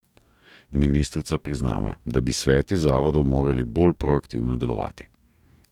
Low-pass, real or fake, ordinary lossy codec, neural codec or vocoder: 19.8 kHz; fake; none; codec, 44.1 kHz, 2.6 kbps, DAC